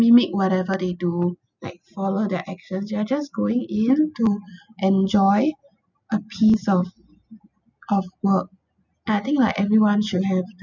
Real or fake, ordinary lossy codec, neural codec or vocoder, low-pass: real; none; none; 7.2 kHz